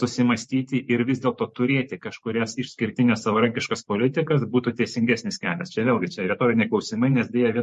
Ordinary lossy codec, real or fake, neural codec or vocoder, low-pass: MP3, 48 kbps; fake; vocoder, 44.1 kHz, 128 mel bands every 512 samples, BigVGAN v2; 14.4 kHz